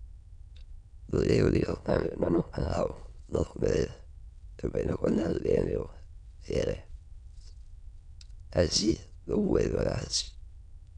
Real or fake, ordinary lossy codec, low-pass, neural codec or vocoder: fake; none; 9.9 kHz; autoencoder, 22.05 kHz, a latent of 192 numbers a frame, VITS, trained on many speakers